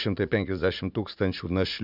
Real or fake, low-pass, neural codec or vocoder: fake; 5.4 kHz; vocoder, 44.1 kHz, 80 mel bands, Vocos